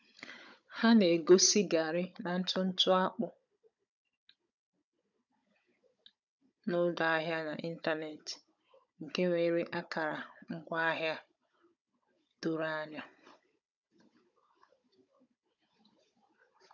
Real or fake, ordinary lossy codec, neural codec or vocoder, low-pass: fake; none; codec, 16 kHz, 16 kbps, FunCodec, trained on Chinese and English, 50 frames a second; 7.2 kHz